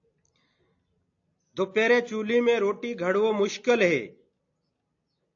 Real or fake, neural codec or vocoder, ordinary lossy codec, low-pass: real; none; AAC, 48 kbps; 7.2 kHz